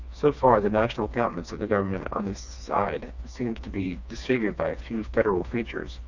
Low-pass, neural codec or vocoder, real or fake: 7.2 kHz; codec, 16 kHz, 2 kbps, FreqCodec, smaller model; fake